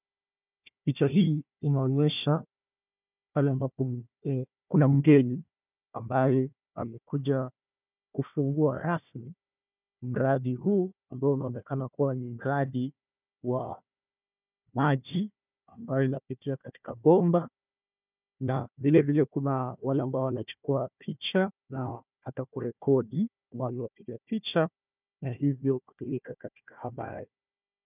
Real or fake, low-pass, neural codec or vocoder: fake; 3.6 kHz; codec, 16 kHz, 1 kbps, FunCodec, trained on Chinese and English, 50 frames a second